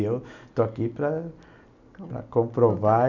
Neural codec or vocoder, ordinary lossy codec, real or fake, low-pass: none; none; real; 7.2 kHz